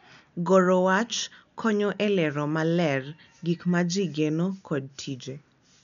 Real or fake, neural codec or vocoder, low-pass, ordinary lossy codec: real; none; 7.2 kHz; none